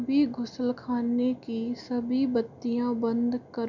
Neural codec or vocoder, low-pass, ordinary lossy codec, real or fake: none; 7.2 kHz; none; real